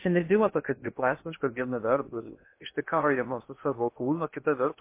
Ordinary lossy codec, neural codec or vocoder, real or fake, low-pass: MP3, 24 kbps; codec, 16 kHz in and 24 kHz out, 0.6 kbps, FocalCodec, streaming, 2048 codes; fake; 3.6 kHz